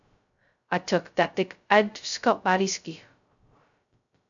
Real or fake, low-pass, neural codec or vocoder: fake; 7.2 kHz; codec, 16 kHz, 0.2 kbps, FocalCodec